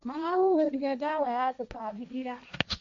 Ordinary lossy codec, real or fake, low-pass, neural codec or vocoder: MP3, 48 kbps; fake; 7.2 kHz; codec, 16 kHz, 1.1 kbps, Voila-Tokenizer